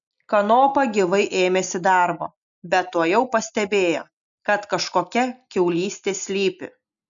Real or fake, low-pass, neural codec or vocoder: real; 7.2 kHz; none